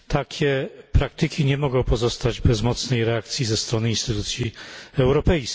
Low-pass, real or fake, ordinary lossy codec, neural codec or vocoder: none; real; none; none